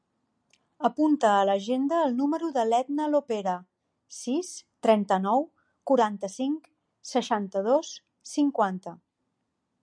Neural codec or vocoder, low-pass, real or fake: none; 9.9 kHz; real